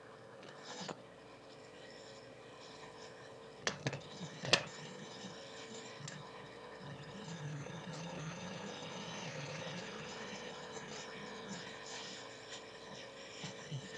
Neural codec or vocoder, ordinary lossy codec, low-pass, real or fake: autoencoder, 22.05 kHz, a latent of 192 numbers a frame, VITS, trained on one speaker; none; none; fake